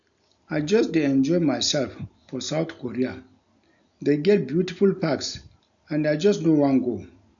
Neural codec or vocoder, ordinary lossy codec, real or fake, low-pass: none; none; real; 7.2 kHz